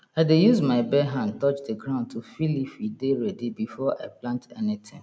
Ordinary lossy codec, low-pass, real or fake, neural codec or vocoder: none; none; real; none